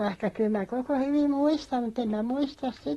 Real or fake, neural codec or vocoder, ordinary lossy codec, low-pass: fake; vocoder, 44.1 kHz, 128 mel bands, Pupu-Vocoder; AAC, 32 kbps; 19.8 kHz